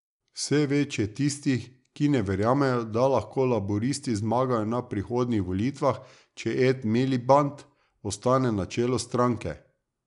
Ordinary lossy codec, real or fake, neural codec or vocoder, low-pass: none; real; none; 10.8 kHz